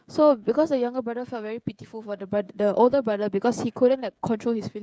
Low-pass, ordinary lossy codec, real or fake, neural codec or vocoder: none; none; fake; codec, 16 kHz, 16 kbps, FreqCodec, smaller model